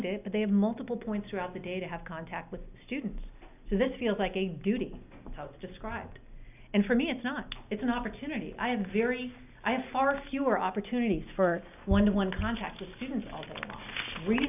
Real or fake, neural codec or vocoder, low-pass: real; none; 3.6 kHz